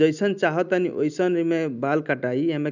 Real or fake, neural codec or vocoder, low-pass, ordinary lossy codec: real; none; 7.2 kHz; none